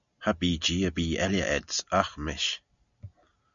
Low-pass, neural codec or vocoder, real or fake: 7.2 kHz; none; real